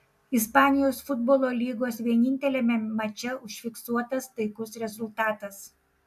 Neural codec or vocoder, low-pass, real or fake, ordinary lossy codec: none; 14.4 kHz; real; AAC, 96 kbps